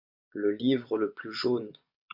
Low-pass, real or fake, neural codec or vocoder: 5.4 kHz; fake; vocoder, 24 kHz, 100 mel bands, Vocos